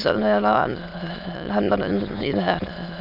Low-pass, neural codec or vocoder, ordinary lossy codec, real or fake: 5.4 kHz; autoencoder, 22.05 kHz, a latent of 192 numbers a frame, VITS, trained on many speakers; none; fake